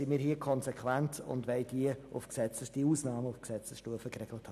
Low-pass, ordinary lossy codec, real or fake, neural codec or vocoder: 14.4 kHz; none; real; none